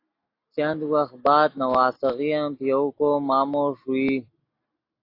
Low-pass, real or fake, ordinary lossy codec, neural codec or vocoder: 5.4 kHz; real; AAC, 32 kbps; none